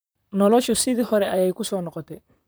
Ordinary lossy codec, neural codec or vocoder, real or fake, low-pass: none; vocoder, 44.1 kHz, 128 mel bands, Pupu-Vocoder; fake; none